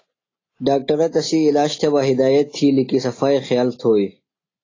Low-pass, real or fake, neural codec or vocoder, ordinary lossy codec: 7.2 kHz; real; none; AAC, 32 kbps